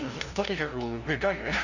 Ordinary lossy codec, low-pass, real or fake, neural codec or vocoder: none; 7.2 kHz; fake; codec, 16 kHz, 0.5 kbps, FunCodec, trained on LibriTTS, 25 frames a second